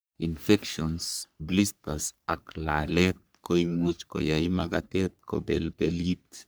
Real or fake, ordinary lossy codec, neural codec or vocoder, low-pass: fake; none; codec, 44.1 kHz, 3.4 kbps, Pupu-Codec; none